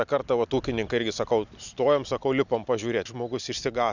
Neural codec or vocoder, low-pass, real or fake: none; 7.2 kHz; real